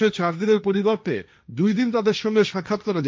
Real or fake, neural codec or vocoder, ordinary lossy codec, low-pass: fake; codec, 16 kHz, 1.1 kbps, Voila-Tokenizer; none; none